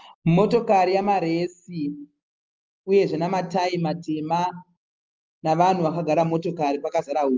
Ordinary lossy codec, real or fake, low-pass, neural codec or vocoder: Opus, 24 kbps; real; 7.2 kHz; none